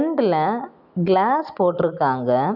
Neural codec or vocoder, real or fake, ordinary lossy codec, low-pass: none; real; none; 5.4 kHz